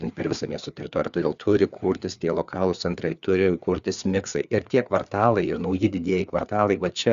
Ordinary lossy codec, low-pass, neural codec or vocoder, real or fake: Opus, 64 kbps; 7.2 kHz; codec, 16 kHz, 4 kbps, FreqCodec, larger model; fake